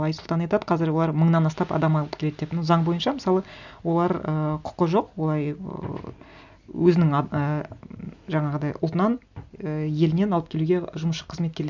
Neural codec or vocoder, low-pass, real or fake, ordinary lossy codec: none; 7.2 kHz; real; none